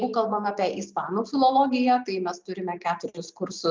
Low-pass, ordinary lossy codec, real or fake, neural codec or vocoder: 7.2 kHz; Opus, 32 kbps; real; none